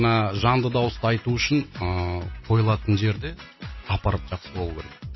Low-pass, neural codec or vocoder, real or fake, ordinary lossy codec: 7.2 kHz; none; real; MP3, 24 kbps